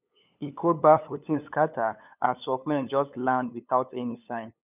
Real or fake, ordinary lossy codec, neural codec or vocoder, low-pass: fake; none; codec, 16 kHz, 8 kbps, FunCodec, trained on LibriTTS, 25 frames a second; 3.6 kHz